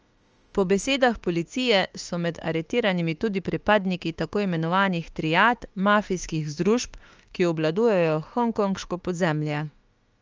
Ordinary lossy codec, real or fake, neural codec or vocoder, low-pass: Opus, 24 kbps; fake; autoencoder, 48 kHz, 32 numbers a frame, DAC-VAE, trained on Japanese speech; 7.2 kHz